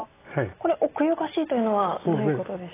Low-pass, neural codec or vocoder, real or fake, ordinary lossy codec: 3.6 kHz; none; real; AAC, 16 kbps